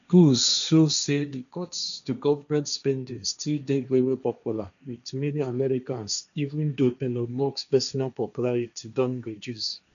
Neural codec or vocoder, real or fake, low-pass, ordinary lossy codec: codec, 16 kHz, 1.1 kbps, Voila-Tokenizer; fake; 7.2 kHz; none